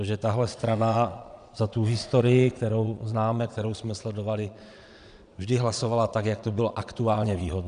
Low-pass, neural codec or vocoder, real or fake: 9.9 kHz; vocoder, 22.05 kHz, 80 mel bands, Vocos; fake